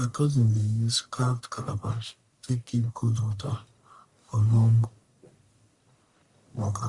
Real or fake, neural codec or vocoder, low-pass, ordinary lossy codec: fake; codec, 44.1 kHz, 1.7 kbps, Pupu-Codec; 10.8 kHz; Opus, 64 kbps